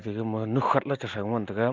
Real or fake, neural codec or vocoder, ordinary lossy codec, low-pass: real; none; Opus, 32 kbps; 7.2 kHz